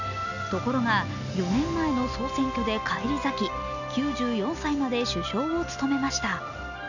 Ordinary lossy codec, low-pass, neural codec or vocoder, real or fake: none; 7.2 kHz; none; real